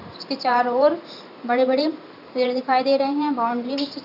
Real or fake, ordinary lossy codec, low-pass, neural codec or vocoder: fake; none; 5.4 kHz; vocoder, 44.1 kHz, 128 mel bands every 512 samples, BigVGAN v2